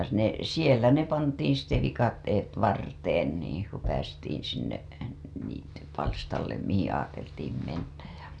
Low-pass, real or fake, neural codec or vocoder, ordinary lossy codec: none; real; none; none